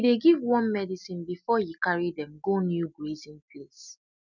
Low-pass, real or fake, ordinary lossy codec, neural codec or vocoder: 7.2 kHz; real; none; none